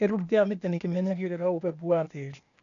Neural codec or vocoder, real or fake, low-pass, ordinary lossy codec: codec, 16 kHz, 0.8 kbps, ZipCodec; fake; 7.2 kHz; none